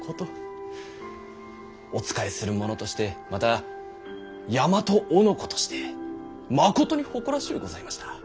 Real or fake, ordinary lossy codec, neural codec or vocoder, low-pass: real; none; none; none